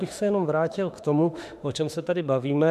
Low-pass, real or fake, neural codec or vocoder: 14.4 kHz; fake; autoencoder, 48 kHz, 32 numbers a frame, DAC-VAE, trained on Japanese speech